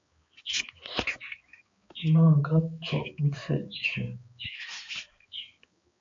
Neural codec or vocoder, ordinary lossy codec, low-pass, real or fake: codec, 16 kHz, 4 kbps, X-Codec, HuBERT features, trained on balanced general audio; MP3, 48 kbps; 7.2 kHz; fake